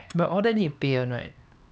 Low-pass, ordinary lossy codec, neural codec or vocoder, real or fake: none; none; codec, 16 kHz, 4 kbps, X-Codec, HuBERT features, trained on LibriSpeech; fake